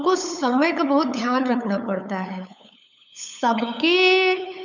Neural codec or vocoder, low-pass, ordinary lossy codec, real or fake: codec, 16 kHz, 8 kbps, FunCodec, trained on LibriTTS, 25 frames a second; 7.2 kHz; none; fake